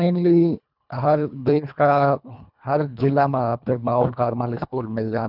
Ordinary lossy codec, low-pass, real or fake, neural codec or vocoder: none; 5.4 kHz; fake; codec, 24 kHz, 1.5 kbps, HILCodec